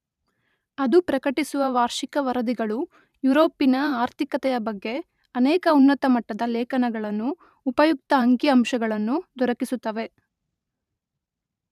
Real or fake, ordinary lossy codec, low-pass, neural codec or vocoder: fake; none; 14.4 kHz; vocoder, 44.1 kHz, 128 mel bands every 512 samples, BigVGAN v2